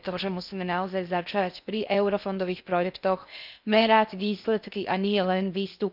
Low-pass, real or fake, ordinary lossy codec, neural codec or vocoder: 5.4 kHz; fake; none; codec, 16 kHz in and 24 kHz out, 0.6 kbps, FocalCodec, streaming, 4096 codes